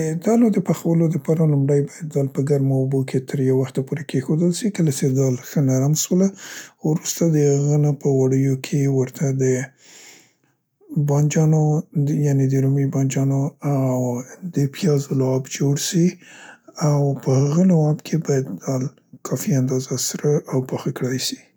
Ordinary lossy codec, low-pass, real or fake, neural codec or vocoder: none; none; real; none